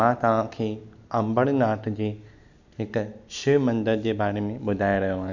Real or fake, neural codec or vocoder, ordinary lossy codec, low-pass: real; none; none; 7.2 kHz